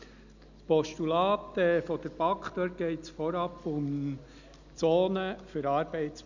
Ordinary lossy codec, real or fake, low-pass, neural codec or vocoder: none; real; 7.2 kHz; none